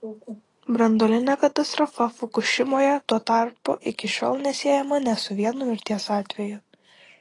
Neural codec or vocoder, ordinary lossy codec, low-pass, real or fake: none; AAC, 32 kbps; 10.8 kHz; real